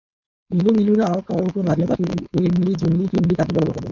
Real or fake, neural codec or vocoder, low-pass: fake; codec, 16 kHz, 4.8 kbps, FACodec; 7.2 kHz